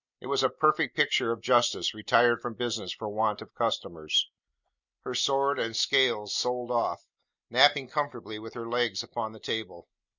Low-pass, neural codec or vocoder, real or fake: 7.2 kHz; none; real